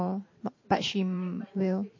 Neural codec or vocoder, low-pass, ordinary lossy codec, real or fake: vocoder, 44.1 kHz, 128 mel bands every 512 samples, BigVGAN v2; 7.2 kHz; MP3, 32 kbps; fake